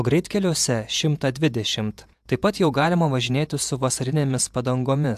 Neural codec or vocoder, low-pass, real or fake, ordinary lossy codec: vocoder, 48 kHz, 128 mel bands, Vocos; 14.4 kHz; fake; AAC, 96 kbps